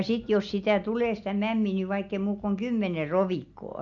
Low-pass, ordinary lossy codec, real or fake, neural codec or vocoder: 7.2 kHz; AAC, 64 kbps; real; none